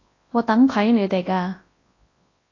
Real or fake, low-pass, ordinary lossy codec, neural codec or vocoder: fake; 7.2 kHz; AAC, 32 kbps; codec, 24 kHz, 0.9 kbps, WavTokenizer, large speech release